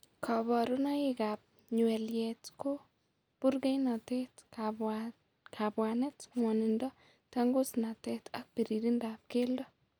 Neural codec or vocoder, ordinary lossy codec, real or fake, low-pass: none; none; real; none